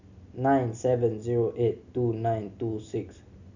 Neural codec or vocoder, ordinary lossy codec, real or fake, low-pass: none; none; real; 7.2 kHz